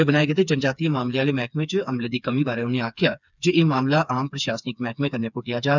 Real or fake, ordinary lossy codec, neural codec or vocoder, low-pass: fake; none; codec, 16 kHz, 4 kbps, FreqCodec, smaller model; 7.2 kHz